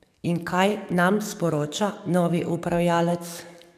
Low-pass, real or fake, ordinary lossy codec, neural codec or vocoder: 14.4 kHz; fake; none; codec, 44.1 kHz, 7.8 kbps, DAC